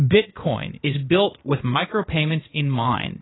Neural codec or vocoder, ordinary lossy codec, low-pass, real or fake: codec, 16 kHz, 0.8 kbps, ZipCodec; AAC, 16 kbps; 7.2 kHz; fake